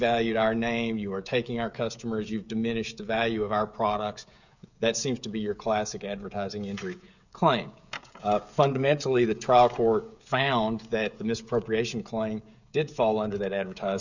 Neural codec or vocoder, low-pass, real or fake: codec, 16 kHz, 16 kbps, FreqCodec, smaller model; 7.2 kHz; fake